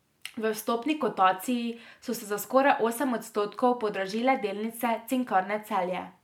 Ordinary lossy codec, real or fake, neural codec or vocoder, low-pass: MP3, 96 kbps; real; none; 19.8 kHz